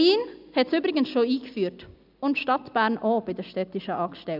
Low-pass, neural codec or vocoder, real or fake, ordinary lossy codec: 5.4 kHz; none; real; none